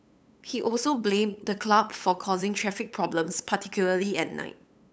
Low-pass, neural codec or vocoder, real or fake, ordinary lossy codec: none; codec, 16 kHz, 8 kbps, FunCodec, trained on LibriTTS, 25 frames a second; fake; none